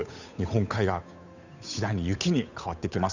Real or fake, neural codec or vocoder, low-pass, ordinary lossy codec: fake; codec, 16 kHz, 8 kbps, FunCodec, trained on Chinese and English, 25 frames a second; 7.2 kHz; none